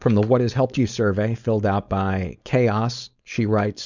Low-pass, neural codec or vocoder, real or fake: 7.2 kHz; codec, 16 kHz, 4.8 kbps, FACodec; fake